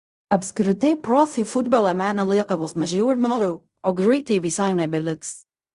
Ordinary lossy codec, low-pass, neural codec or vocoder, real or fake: Opus, 64 kbps; 10.8 kHz; codec, 16 kHz in and 24 kHz out, 0.4 kbps, LongCat-Audio-Codec, fine tuned four codebook decoder; fake